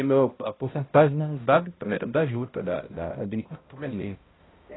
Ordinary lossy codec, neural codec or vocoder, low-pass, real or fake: AAC, 16 kbps; codec, 16 kHz, 0.5 kbps, X-Codec, HuBERT features, trained on balanced general audio; 7.2 kHz; fake